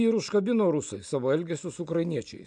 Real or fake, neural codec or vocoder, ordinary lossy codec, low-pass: real; none; AAC, 64 kbps; 9.9 kHz